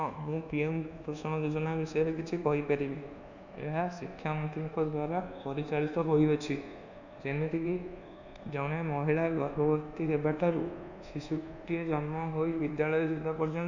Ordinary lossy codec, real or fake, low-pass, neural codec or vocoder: none; fake; 7.2 kHz; codec, 24 kHz, 1.2 kbps, DualCodec